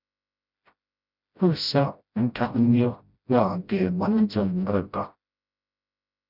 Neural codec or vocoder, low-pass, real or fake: codec, 16 kHz, 0.5 kbps, FreqCodec, smaller model; 5.4 kHz; fake